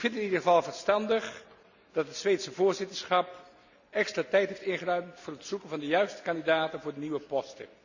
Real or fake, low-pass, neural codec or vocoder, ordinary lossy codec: real; 7.2 kHz; none; none